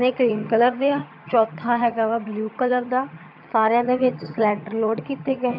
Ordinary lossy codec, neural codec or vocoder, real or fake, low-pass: AAC, 48 kbps; vocoder, 22.05 kHz, 80 mel bands, HiFi-GAN; fake; 5.4 kHz